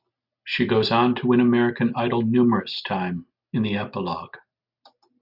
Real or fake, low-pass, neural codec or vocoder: real; 5.4 kHz; none